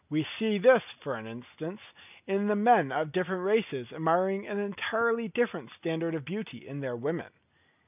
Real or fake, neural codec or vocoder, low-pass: real; none; 3.6 kHz